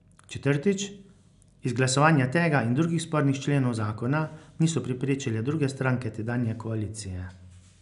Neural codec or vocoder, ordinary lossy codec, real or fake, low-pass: none; none; real; 10.8 kHz